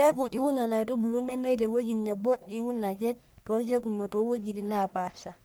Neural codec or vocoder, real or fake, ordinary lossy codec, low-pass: codec, 44.1 kHz, 1.7 kbps, Pupu-Codec; fake; none; none